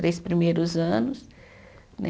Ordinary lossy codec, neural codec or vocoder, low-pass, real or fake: none; none; none; real